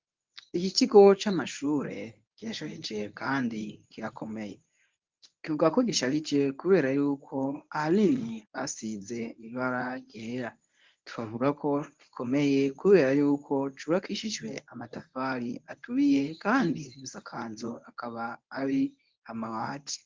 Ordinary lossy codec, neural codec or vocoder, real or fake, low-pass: Opus, 24 kbps; codec, 24 kHz, 0.9 kbps, WavTokenizer, medium speech release version 1; fake; 7.2 kHz